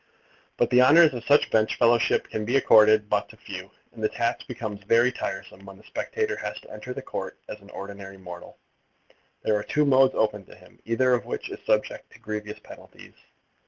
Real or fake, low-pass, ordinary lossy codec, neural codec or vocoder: real; 7.2 kHz; Opus, 16 kbps; none